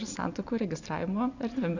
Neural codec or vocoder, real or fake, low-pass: none; real; 7.2 kHz